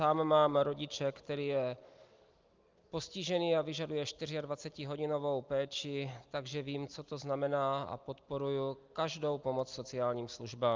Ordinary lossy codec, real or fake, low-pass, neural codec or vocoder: Opus, 32 kbps; real; 7.2 kHz; none